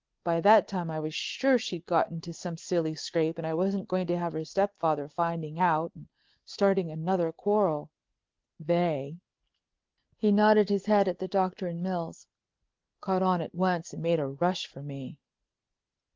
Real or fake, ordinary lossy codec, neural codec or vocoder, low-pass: real; Opus, 16 kbps; none; 7.2 kHz